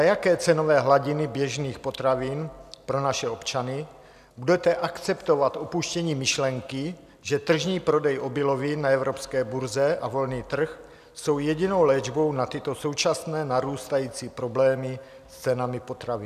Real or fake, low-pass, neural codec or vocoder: real; 14.4 kHz; none